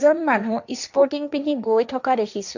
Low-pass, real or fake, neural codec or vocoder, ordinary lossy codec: 7.2 kHz; fake; codec, 16 kHz, 1.1 kbps, Voila-Tokenizer; none